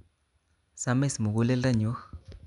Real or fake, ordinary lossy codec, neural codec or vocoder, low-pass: real; none; none; 10.8 kHz